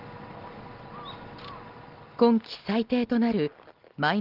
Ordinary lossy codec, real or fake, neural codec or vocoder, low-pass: Opus, 16 kbps; real; none; 5.4 kHz